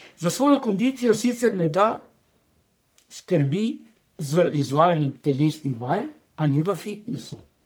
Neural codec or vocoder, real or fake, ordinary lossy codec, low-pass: codec, 44.1 kHz, 1.7 kbps, Pupu-Codec; fake; none; none